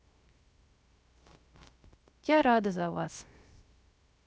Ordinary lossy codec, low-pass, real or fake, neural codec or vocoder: none; none; fake; codec, 16 kHz, 0.3 kbps, FocalCodec